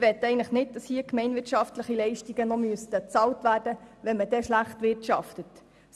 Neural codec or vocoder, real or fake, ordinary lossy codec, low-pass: none; real; none; none